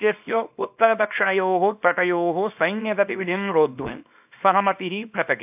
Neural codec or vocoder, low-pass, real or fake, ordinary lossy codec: codec, 24 kHz, 0.9 kbps, WavTokenizer, small release; 3.6 kHz; fake; AAC, 32 kbps